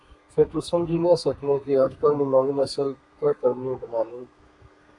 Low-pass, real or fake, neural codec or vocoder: 10.8 kHz; fake; codec, 32 kHz, 1.9 kbps, SNAC